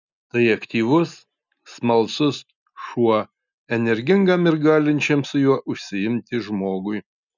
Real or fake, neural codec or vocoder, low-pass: real; none; 7.2 kHz